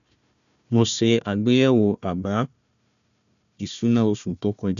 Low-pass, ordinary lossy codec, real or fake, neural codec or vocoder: 7.2 kHz; none; fake; codec, 16 kHz, 1 kbps, FunCodec, trained on Chinese and English, 50 frames a second